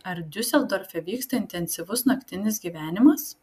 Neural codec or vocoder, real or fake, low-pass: none; real; 14.4 kHz